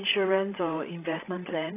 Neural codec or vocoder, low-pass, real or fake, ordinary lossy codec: codec, 16 kHz, 16 kbps, FreqCodec, larger model; 3.6 kHz; fake; AAC, 24 kbps